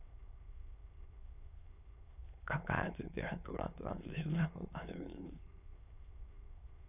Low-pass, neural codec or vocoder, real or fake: 3.6 kHz; autoencoder, 22.05 kHz, a latent of 192 numbers a frame, VITS, trained on many speakers; fake